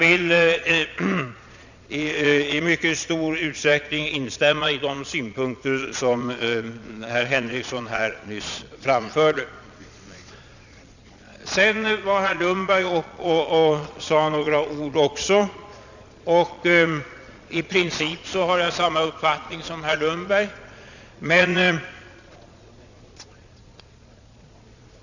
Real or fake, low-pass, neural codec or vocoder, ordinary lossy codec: fake; 7.2 kHz; vocoder, 22.05 kHz, 80 mel bands, Vocos; none